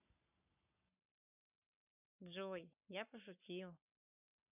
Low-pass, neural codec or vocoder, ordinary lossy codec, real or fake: 3.6 kHz; codec, 44.1 kHz, 7.8 kbps, Pupu-Codec; none; fake